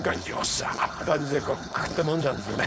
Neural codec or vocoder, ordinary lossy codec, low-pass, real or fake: codec, 16 kHz, 4.8 kbps, FACodec; none; none; fake